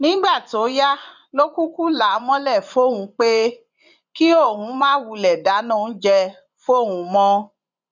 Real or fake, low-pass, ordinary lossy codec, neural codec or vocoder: fake; 7.2 kHz; none; vocoder, 24 kHz, 100 mel bands, Vocos